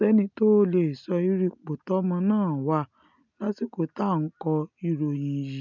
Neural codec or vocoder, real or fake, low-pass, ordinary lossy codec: none; real; 7.2 kHz; none